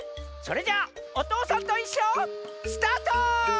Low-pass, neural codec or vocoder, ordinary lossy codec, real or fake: none; none; none; real